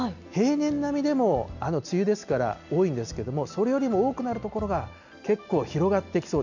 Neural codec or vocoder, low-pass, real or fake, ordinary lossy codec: none; 7.2 kHz; real; none